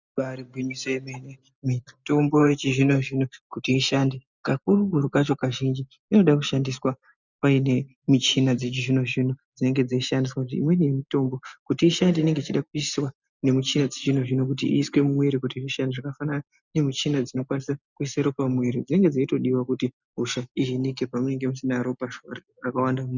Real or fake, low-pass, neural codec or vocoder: real; 7.2 kHz; none